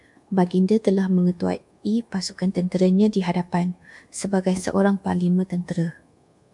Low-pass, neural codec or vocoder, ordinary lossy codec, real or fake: 10.8 kHz; codec, 24 kHz, 1.2 kbps, DualCodec; MP3, 64 kbps; fake